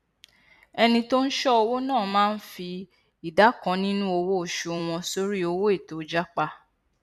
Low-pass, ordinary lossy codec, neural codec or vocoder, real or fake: 14.4 kHz; none; vocoder, 44.1 kHz, 128 mel bands every 256 samples, BigVGAN v2; fake